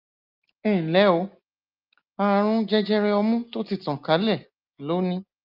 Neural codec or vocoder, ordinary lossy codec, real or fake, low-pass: none; Opus, 24 kbps; real; 5.4 kHz